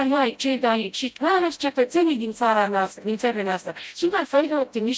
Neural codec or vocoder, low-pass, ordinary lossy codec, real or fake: codec, 16 kHz, 0.5 kbps, FreqCodec, smaller model; none; none; fake